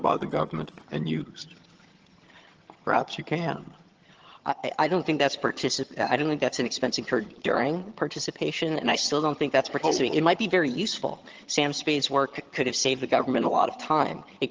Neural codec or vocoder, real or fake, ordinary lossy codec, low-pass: vocoder, 22.05 kHz, 80 mel bands, HiFi-GAN; fake; Opus, 16 kbps; 7.2 kHz